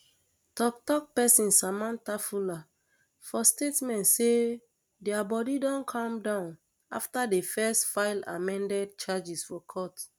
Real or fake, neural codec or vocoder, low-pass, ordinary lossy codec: real; none; none; none